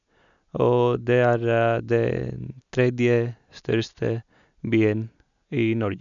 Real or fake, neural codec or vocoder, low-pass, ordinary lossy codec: real; none; 7.2 kHz; none